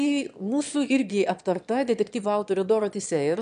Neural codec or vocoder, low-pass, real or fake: autoencoder, 22.05 kHz, a latent of 192 numbers a frame, VITS, trained on one speaker; 9.9 kHz; fake